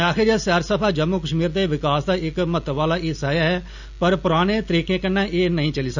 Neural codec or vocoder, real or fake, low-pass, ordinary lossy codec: none; real; 7.2 kHz; none